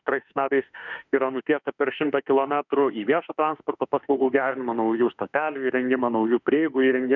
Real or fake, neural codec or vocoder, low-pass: fake; autoencoder, 48 kHz, 32 numbers a frame, DAC-VAE, trained on Japanese speech; 7.2 kHz